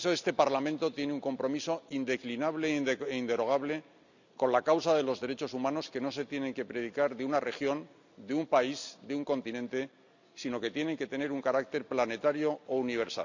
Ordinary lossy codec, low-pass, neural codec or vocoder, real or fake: none; 7.2 kHz; none; real